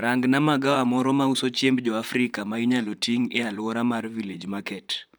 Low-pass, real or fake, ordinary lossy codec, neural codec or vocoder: none; fake; none; vocoder, 44.1 kHz, 128 mel bands, Pupu-Vocoder